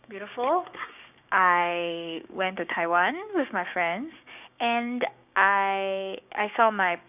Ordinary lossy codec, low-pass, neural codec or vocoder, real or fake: none; 3.6 kHz; codec, 16 kHz, 6 kbps, DAC; fake